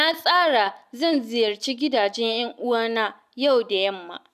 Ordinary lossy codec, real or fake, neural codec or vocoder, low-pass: none; real; none; 14.4 kHz